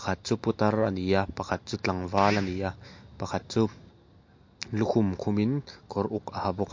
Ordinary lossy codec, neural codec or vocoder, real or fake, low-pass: MP3, 48 kbps; none; real; 7.2 kHz